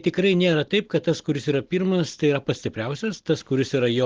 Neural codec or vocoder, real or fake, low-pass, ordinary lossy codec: none; real; 7.2 kHz; Opus, 16 kbps